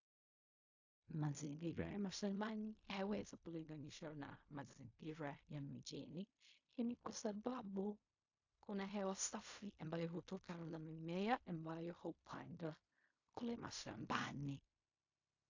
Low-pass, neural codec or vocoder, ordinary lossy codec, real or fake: 7.2 kHz; codec, 16 kHz in and 24 kHz out, 0.4 kbps, LongCat-Audio-Codec, fine tuned four codebook decoder; AAC, 48 kbps; fake